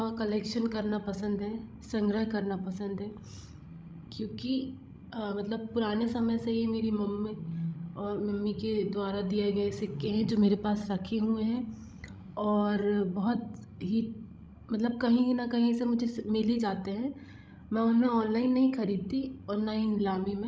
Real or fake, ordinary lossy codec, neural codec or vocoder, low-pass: fake; none; codec, 16 kHz, 16 kbps, FreqCodec, larger model; none